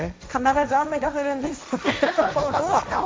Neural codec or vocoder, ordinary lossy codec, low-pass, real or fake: codec, 16 kHz, 1.1 kbps, Voila-Tokenizer; none; none; fake